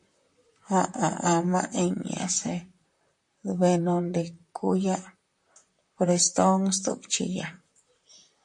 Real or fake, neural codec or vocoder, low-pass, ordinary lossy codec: fake; vocoder, 44.1 kHz, 128 mel bands, Pupu-Vocoder; 10.8 kHz; MP3, 48 kbps